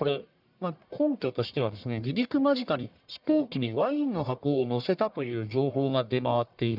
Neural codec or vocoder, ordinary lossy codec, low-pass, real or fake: codec, 44.1 kHz, 1.7 kbps, Pupu-Codec; none; 5.4 kHz; fake